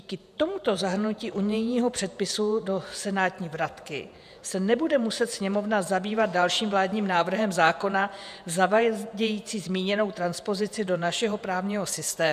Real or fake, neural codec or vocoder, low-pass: fake; vocoder, 48 kHz, 128 mel bands, Vocos; 14.4 kHz